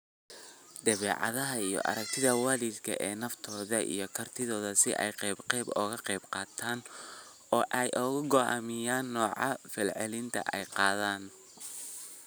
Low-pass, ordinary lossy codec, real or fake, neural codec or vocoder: none; none; real; none